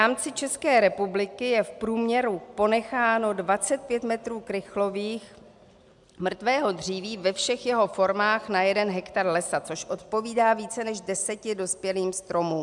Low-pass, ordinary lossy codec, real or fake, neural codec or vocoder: 10.8 kHz; MP3, 96 kbps; real; none